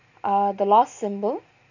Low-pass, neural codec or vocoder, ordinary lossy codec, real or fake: 7.2 kHz; none; none; real